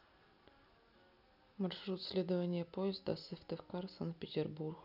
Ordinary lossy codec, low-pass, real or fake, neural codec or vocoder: none; 5.4 kHz; real; none